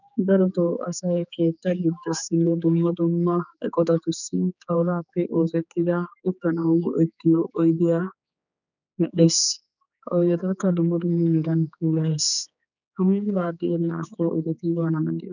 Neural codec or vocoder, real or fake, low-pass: codec, 16 kHz, 4 kbps, X-Codec, HuBERT features, trained on general audio; fake; 7.2 kHz